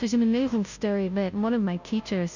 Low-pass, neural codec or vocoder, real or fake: 7.2 kHz; codec, 16 kHz, 0.5 kbps, FunCodec, trained on Chinese and English, 25 frames a second; fake